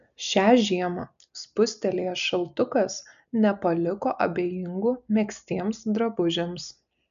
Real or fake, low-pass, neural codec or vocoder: real; 7.2 kHz; none